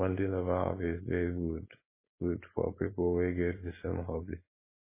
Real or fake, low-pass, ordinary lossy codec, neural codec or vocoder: fake; 3.6 kHz; MP3, 16 kbps; codec, 16 kHz, 4.8 kbps, FACodec